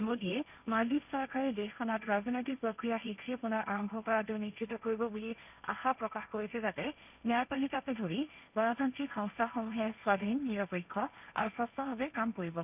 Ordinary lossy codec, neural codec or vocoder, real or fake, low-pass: none; codec, 16 kHz, 1.1 kbps, Voila-Tokenizer; fake; 3.6 kHz